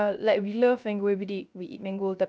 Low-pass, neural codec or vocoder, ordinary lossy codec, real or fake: none; codec, 16 kHz, 0.3 kbps, FocalCodec; none; fake